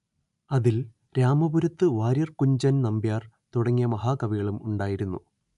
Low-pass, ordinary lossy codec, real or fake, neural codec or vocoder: 10.8 kHz; none; real; none